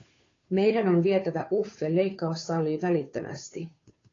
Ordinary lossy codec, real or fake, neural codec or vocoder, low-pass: AAC, 32 kbps; fake; codec, 16 kHz, 2 kbps, FunCodec, trained on Chinese and English, 25 frames a second; 7.2 kHz